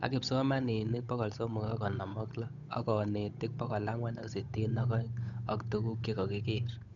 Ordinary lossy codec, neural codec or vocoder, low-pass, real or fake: none; codec, 16 kHz, 8 kbps, FunCodec, trained on Chinese and English, 25 frames a second; 7.2 kHz; fake